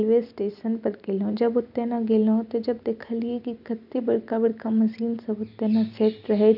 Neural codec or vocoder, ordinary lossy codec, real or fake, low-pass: none; none; real; 5.4 kHz